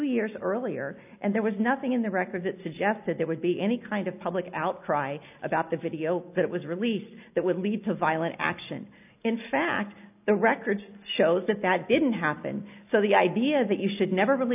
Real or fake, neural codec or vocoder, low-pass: real; none; 3.6 kHz